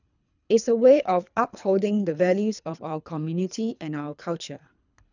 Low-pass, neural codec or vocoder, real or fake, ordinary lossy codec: 7.2 kHz; codec, 24 kHz, 3 kbps, HILCodec; fake; none